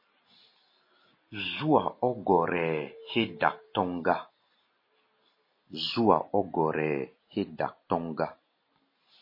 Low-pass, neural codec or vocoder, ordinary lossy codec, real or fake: 5.4 kHz; none; MP3, 24 kbps; real